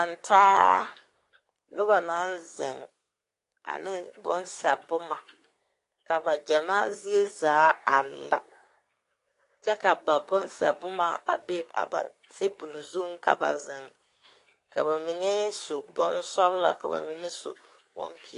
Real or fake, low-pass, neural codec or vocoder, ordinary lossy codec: fake; 10.8 kHz; codec, 24 kHz, 1 kbps, SNAC; AAC, 48 kbps